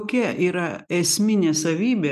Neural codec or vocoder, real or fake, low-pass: none; real; 14.4 kHz